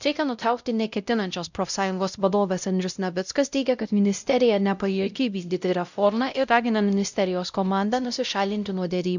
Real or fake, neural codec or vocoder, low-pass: fake; codec, 16 kHz, 0.5 kbps, X-Codec, WavLM features, trained on Multilingual LibriSpeech; 7.2 kHz